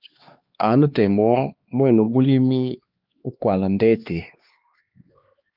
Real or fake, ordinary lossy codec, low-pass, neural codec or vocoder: fake; Opus, 24 kbps; 5.4 kHz; codec, 16 kHz, 2 kbps, X-Codec, HuBERT features, trained on LibriSpeech